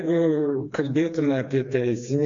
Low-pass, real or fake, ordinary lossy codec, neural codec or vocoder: 7.2 kHz; fake; MP3, 48 kbps; codec, 16 kHz, 2 kbps, FreqCodec, smaller model